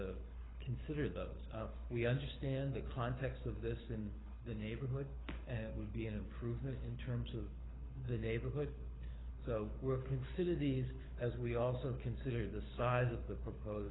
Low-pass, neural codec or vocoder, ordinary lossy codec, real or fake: 7.2 kHz; codec, 24 kHz, 6 kbps, HILCodec; AAC, 16 kbps; fake